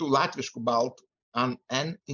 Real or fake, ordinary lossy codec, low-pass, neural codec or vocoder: real; MP3, 64 kbps; 7.2 kHz; none